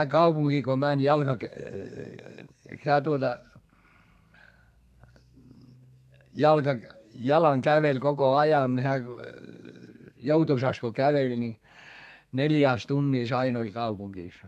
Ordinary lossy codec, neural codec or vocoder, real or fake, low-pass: AAC, 96 kbps; codec, 32 kHz, 1.9 kbps, SNAC; fake; 14.4 kHz